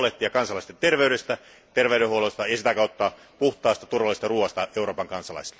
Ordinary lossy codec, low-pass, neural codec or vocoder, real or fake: none; none; none; real